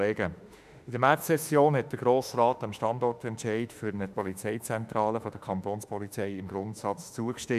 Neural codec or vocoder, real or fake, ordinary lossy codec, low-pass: autoencoder, 48 kHz, 32 numbers a frame, DAC-VAE, trained on Japanese speech; fake; none; 14.4 kHz